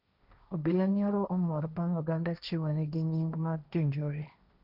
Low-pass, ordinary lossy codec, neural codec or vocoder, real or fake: 5.4 kHz; none; codec, 16 kHz, 1.1 kbps, Voila-Tokenizer; fake